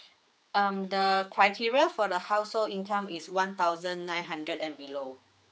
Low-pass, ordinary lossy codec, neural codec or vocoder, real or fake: none; none; codec, 16 kHz, 4 kbps, X-Codec, HuBERT features, trained on general audio; fake